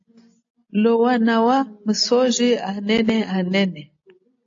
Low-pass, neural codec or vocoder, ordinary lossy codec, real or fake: 7.2 kHz; none; AAC, 48 kbps; real